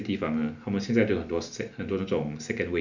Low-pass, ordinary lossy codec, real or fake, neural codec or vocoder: 7.2 kHz; none; real; none